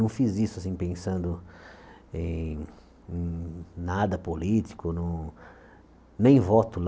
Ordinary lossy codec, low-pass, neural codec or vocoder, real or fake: none; none; none; real